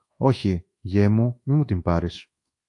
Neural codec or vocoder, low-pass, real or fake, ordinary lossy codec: codec, 24 kHz, 1.2 kbps, DualCodec; 10.8 kHz; fake; AAC, 48 kbps